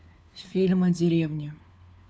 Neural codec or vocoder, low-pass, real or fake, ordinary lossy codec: codec, 16 kHz, 4 kbps, FunCodec, trained on LibriTTS, 50 frames a second; none; fake; none